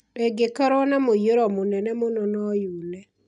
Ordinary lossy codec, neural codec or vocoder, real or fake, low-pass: none; none; real; 10.8 kHz